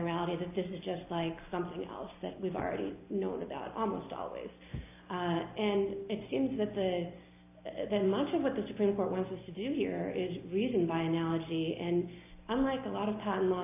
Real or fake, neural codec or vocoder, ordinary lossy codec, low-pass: real; none; AAC, 24 kbps; 3.6 kHz